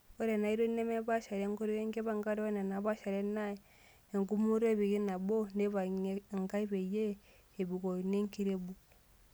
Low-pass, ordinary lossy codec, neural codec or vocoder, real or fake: none; none; none; real